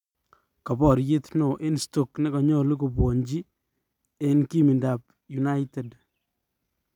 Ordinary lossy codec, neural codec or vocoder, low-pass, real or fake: none; none; 19.8 kHz; real